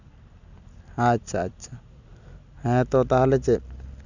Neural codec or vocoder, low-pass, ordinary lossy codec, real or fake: none; 7.2 kHz; none; real